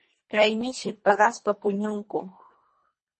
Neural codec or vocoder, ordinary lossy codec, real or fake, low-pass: codec, 24 kHz, 1.5 kbps, HILCodec; MP3, 32 kbps; fake; 10.8 kHz